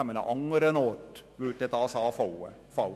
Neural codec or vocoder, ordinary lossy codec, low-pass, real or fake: autoencoder, 48 kHz, 128 numbers a frame, DAC-VAE, trained on Japanese speech; MP3, 64 kbps; 14.4 kHz; fake